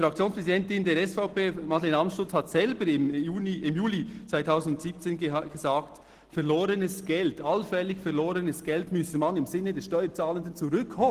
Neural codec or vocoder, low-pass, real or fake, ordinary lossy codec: none; 14.4 kHz; real; Opus, 16 kbps